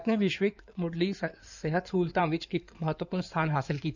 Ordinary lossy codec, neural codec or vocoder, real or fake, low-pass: none; codec, 16 kHz in and 24 kHz out, 2.2 kbps, FireRedTTS-2 codec; fake; 7.2 kHz